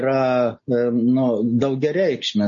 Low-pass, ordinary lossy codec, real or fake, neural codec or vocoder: 7.2 kHz; MP3, 32 kbps; real; none